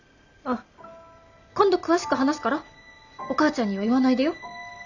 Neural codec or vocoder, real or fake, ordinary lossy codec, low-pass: none; real; none; 7.2 kHz